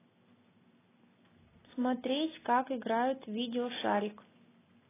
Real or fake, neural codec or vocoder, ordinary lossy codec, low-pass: real; none; AAC, 16 kbps; 3.6 kHz